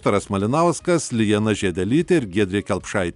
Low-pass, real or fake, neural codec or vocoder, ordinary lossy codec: 10.8 kHz; real; none; MP3, 96 kbps